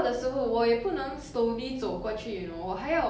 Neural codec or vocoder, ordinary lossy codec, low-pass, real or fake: none; none; none; real